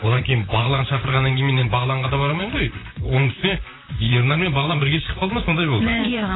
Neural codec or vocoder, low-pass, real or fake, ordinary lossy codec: vocoder, 44.1 kHz, 128 mel bands, Pupu-Vocoder; 7.2 kHz; fake; AAC, 16 kbps